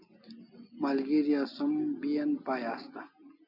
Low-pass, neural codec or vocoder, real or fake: 5.4 kHz; none; real